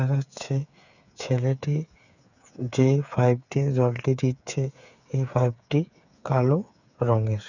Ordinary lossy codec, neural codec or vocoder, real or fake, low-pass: none; codec, 16 kHz, 8 kbps, FreqCodec, smaller model; fake; 7.2 kHz